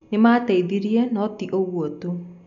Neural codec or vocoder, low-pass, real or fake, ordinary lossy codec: none; 7.2 kHz; real; none